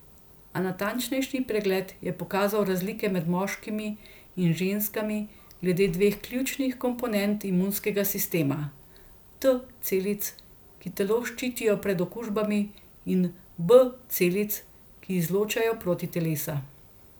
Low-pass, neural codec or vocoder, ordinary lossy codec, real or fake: none; none; none; real